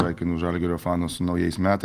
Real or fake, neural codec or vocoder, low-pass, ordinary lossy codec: real; none; 14.4 kHz; Opus, 24 kbps